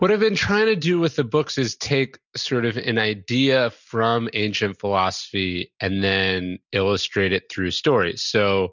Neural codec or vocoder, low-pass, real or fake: none; 7.2 kHz; real